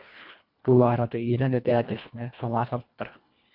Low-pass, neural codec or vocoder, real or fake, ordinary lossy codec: 5.4 kHz; codec, 24 kHz, 1.5 kbps, HILCodec; fake; MP3, 48 kbps